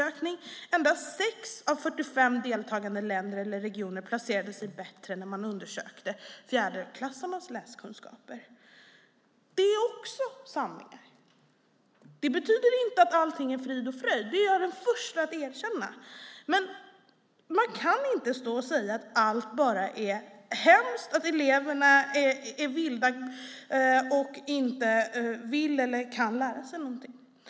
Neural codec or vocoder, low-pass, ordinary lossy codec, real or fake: none; none; none; real